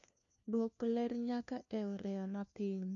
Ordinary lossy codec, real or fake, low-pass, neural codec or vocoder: none; fake; 7.2 kHz; codec, 16 kHz, 1 kbps, FunCodec, trained on LibriTTS, 50 frames a second